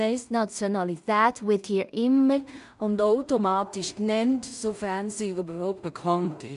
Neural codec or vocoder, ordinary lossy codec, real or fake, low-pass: codec, 16 kHz in and 24 kHz out, 0.4 kbps, LongCat-Audio-Codec, two codebook decoder; none; fake; 10.8 kHz